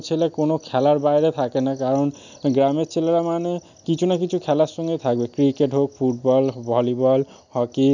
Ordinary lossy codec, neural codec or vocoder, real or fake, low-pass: none; none; real; 7.2 kHz